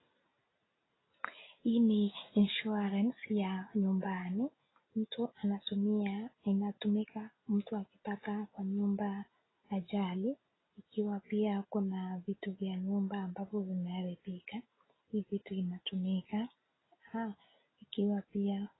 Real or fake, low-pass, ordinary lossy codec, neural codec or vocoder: real; 7.2 kHz; AAC, 16 kbps; none